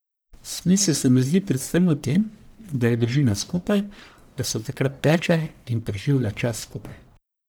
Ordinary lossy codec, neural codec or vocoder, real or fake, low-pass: none; codec, 44.1 kHz, 1.7 kbps, Pupu-Codec; fake; none